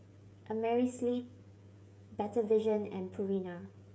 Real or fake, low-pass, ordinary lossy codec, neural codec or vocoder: fake; none; none; codec, 16 kHz, 16 kbps, FreqCodec, smaller model